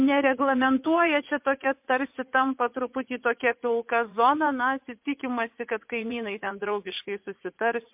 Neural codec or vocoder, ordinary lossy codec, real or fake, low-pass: vocoder, 44.1 kHz, 80 mel bands, Vocos; MP3, 32 kbps; fake; 3.6 kHz